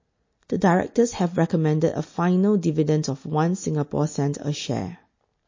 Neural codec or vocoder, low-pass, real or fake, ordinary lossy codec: none; 7.2 kHz; real; MP3, 32 kbps